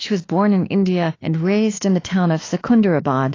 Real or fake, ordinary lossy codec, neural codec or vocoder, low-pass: fake; AAC, 32 kbps; autoencoder, 48 kHz, 32 numbers a frame, DAC-VAE, trained on Japanese speech; 7.2 kHz